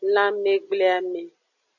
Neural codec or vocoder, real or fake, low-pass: none; real; 7.2 kHz